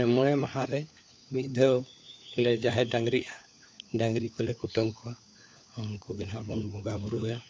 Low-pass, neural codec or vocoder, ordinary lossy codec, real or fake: none; codec, 16 kHz, 4 kbps, FunCodec, trained on LibriTTS, 50 frames a second; none; fake